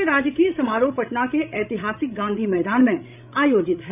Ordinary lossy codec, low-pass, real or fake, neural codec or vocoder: none; 3.6 kHz; fake; codec, 16 kHz, 16 kbps, FreqCodec, larger model